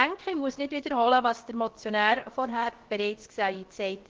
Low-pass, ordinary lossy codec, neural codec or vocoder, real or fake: 7.2 kHz; Opus, 16 kbps; codec, 16 kHz, about 1 kbps, DyCAST, with the encoder's durations; fake